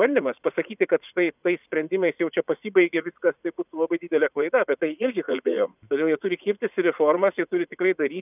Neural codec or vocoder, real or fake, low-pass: vocoder, 44.1 kHz, 80 mel bands, Vocos; fake; 3.6 kHz